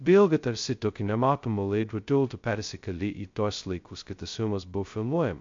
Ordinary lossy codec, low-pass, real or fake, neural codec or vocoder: MP3, 48 kbps; 7.2 kHz; fake; codec, 16 kHz, 0.2 kbps, FocalCodec